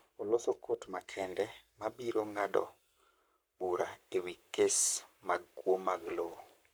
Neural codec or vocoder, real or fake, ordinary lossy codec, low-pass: codec, 44.1 kHz, 7.8 kbps, Pupu-Codec; fake; none; none